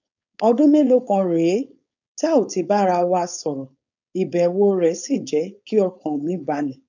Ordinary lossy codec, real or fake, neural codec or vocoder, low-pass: none; fake; codec, 16 kHz, 4.8 kbps, FACodec; 7.2 kHz